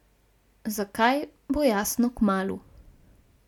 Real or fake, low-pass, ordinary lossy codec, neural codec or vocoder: real; 19.8 kHz; none; none